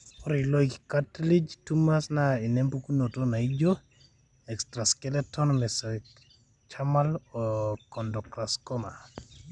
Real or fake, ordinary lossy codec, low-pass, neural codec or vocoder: real; none; 10.8 kHz; none